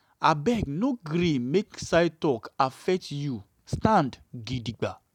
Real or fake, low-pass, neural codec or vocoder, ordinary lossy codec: real; 19.8 kHz; none; none